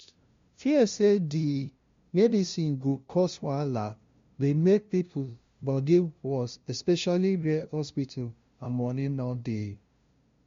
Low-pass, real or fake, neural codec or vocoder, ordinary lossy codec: 7.2 kHz; fake; codec, 16 kHz, 0.5 kbps, FunCodec, trained on LibriTTS, 25 frames a second; MP3, 48 kbps